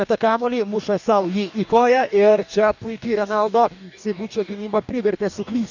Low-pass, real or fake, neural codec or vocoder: 7.2 kHz; fake; codec, 44.1 kHz, 2.6 kbps, DAC